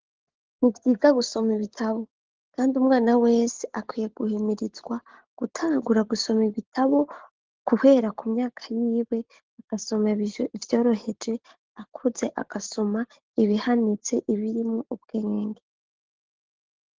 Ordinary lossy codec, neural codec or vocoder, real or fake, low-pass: Opus, 16 kbps; none; real; 7.2 kHz